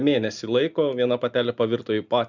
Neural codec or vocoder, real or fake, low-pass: none; real; 7.2 kHz